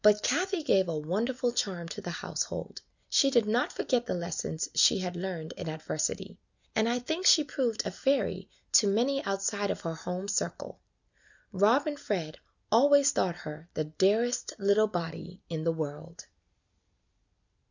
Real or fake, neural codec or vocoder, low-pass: real; none; 7.2 kHz